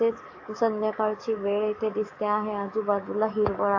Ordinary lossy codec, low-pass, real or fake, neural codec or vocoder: AAC, 48 kbps; 7.2 kHz; fake; codec, 44.1 kHz, 7.8 kbps, DAC